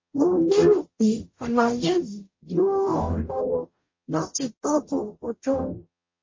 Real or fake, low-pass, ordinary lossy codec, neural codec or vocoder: fake; 7.2 kHz; MP3, 32 kbps; codec, 44.1 kHz, 0.9 kbps, DAC